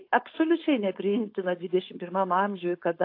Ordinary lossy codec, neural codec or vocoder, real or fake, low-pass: AAC, 32 kbps; codec, 16 kHz, 4.8 kbps, FACodec; fake; 5.4 kHz